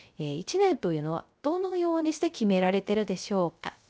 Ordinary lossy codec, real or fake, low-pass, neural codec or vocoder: none; fake; none; codec, 16 kHz, 0.3 kbps, FocalCodec